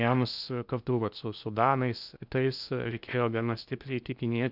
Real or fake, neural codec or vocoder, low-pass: fake; codec, 16 kHz in and 24 kHz out, 0.6 kbps, FocalCodec, streaming, 2048 codes; 5.4 kHz